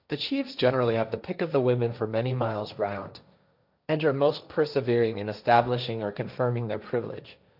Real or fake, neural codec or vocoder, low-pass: fake; codec, 16 kHz, 1.1 kbps, Voila-Tokenizer; 5.4 kHz